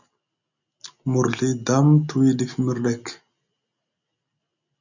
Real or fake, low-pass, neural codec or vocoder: real; 7.2 kHz; none